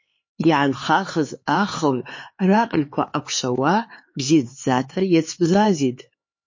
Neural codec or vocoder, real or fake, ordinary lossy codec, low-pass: codec, 16 kHz, 4 kbps, X-Codec, HuBERT features, trained on LibriSpeech; fake; MP3, 32 kbps; 7.2 kHz